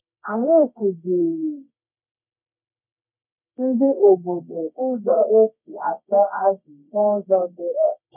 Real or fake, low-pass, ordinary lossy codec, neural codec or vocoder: fake; 3.6 kHz; none; codec, 24 kHz, 0.9 kbps, WavTokenizer, medium music audio release